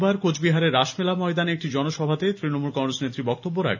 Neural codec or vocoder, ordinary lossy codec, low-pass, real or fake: none; none; 7.2 kHz; real